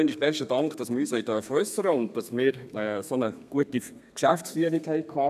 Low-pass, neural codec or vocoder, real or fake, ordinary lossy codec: 14.4 kHz; codec, 32 kHz, 1.9 kbps, SNAC; fake; none